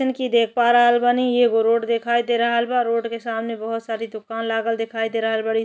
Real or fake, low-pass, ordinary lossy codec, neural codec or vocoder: real; none; none; none